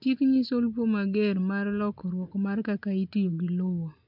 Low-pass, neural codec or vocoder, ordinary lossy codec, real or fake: 5.4 kHz; none; MP3, 48 kbps; real